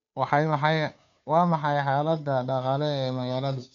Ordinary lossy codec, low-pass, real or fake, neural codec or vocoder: MP3, 64 kbps; 7.2 kHz; fake; codec, 16 kHz, 2 kbps, FunCodec, trained on Chinese and English, 25 frames a second